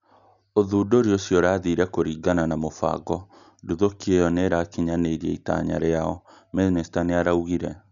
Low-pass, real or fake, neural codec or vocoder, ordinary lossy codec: 7.2 kHz; real; none; none